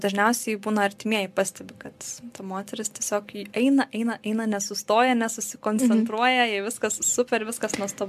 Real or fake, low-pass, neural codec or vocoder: real; 14.4 kHz; none